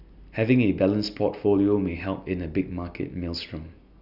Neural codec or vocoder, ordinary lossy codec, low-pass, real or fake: none; none; 5.4 kHz; real